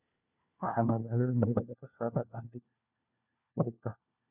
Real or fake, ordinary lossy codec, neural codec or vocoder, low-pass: fake; none; codec, 16 kHz, 1 kbps, FunCodec, trained on LibriTTS, 50 frames a second; 3.6 kHz